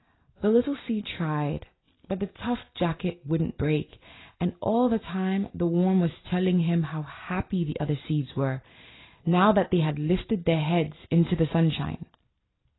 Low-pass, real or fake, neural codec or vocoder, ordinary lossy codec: 7.2 kHz; real; none; AAC, 16 kbps